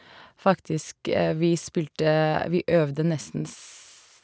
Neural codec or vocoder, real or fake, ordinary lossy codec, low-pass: none; real; none; none